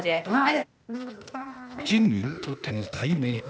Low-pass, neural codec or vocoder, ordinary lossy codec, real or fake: none; codec, 16 kHz, 0.8 kbps, ZipCodec; none; fake